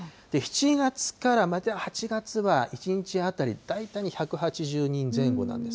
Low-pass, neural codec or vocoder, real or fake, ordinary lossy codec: none; none; real; none